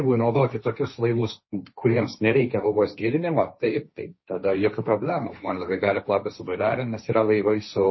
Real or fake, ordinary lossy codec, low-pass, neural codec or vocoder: fake; MP3, 24 kbps; 7.2 kHz; codec, 16 kHz, 1.1 kbps, Voila-Tokenizer